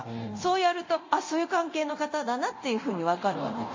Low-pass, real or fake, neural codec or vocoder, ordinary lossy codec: 7.2 kHz; fake; codec, 24 kHz, 0.9 kbps, DualCodec; MP3, 32 kbps